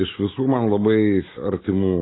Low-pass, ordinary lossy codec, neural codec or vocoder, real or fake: 7.2 kHz; AAC, 16 kbps; none; real